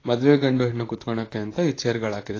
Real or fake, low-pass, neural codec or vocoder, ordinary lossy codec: fake; 7.2 kHz; vocoder, 44.1 kHz, 128 mel bands, Pupu-Vocoder; AAC, 32 kbps